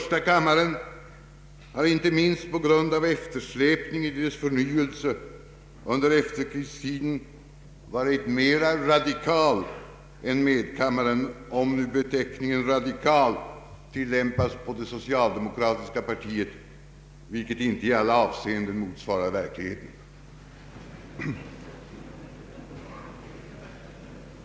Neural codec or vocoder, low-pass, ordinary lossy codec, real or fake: none; none; none; real